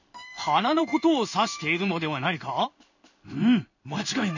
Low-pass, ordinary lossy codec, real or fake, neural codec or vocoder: 7.2 kHz; none; fake; codec, 16 kHz in and 24 kHz out, 1 kbps, XY-Tokenizer